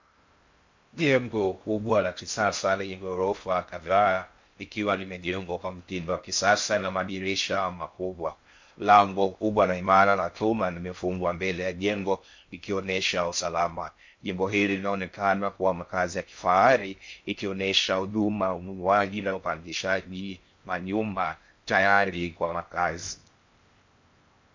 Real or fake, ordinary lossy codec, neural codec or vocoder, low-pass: fake; MP3, 48 kbps; codec, 16 kHz in and 24 kHz out, 0.6 kbps, FocalCodec, streaming, 2048 codes; 7.2 kHz